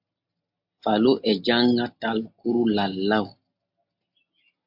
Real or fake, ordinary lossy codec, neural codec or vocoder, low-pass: real; MP3, 48 kbps; none; 5.4 kHz